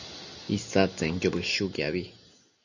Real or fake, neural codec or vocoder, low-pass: real; none; 7.2 kHz